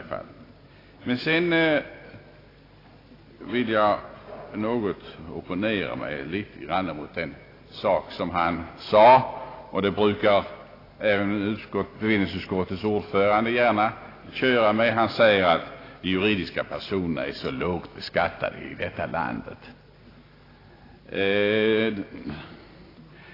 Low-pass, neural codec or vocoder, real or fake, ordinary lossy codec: 5.4 kHz; none; real; AAC, 24 kbps